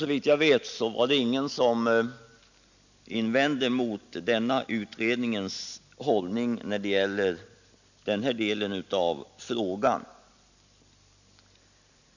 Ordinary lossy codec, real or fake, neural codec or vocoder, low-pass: none; real; none; 7.2 kHz